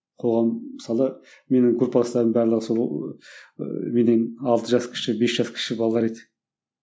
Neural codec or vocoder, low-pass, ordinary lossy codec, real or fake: none; none; none; real